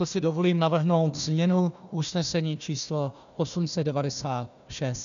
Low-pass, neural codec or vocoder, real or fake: 7.2 kHz; codec, 16 kHz, 1 kbps, FunCodec, trained on Chinese and English, 50 frames a second; fake